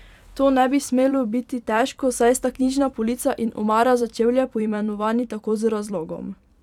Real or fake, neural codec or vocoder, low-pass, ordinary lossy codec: fake; vocoder, 44.1 kHz, 128 mel bands every 256 samples, BigVGAN v2; 19.8 kHz; none